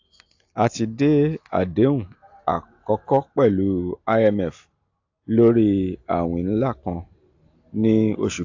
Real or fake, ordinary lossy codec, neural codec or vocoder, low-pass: real; AAC, 48 kbps; none; 7.2 kHz